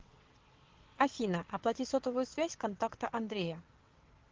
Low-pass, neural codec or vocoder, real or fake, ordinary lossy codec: 7.2 kHz; vocoder, 22.05 kHz, 80 mel bands, WaveNeXt; fake; Opus, 16 kbps